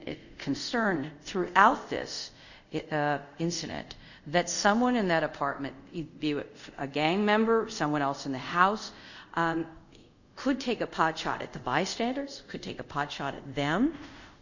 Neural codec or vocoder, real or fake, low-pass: codec, 24 kHz, 0.5 kbps, DualCodec; fake; 7.2 kHz